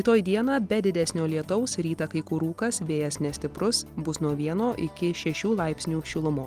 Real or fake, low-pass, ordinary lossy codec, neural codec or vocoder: real; 14.4 kHz; Opus, 24 kbps; none